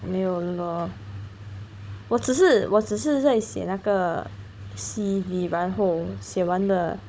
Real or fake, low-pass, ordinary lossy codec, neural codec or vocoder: fake; none; none; codec, 16 kHz, 16 kbps, FunCodec, trained on Chinese and English, 50 frames a second